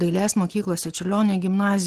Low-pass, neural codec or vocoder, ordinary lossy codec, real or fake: 14.4 kHz; none; Opus, 16 kbps; real